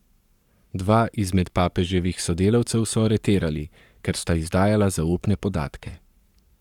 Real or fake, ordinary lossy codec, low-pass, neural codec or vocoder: fake; none; 19.8 kHz; codec, 44.1 kHz, 7.8 kbps, Pupu-Codec